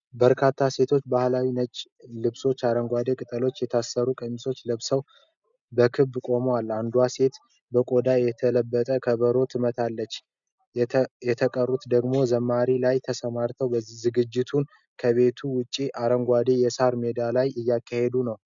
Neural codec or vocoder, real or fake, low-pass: none; real; 7.2 kHz